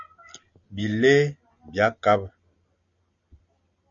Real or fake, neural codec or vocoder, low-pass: real; none; 7.2 kHz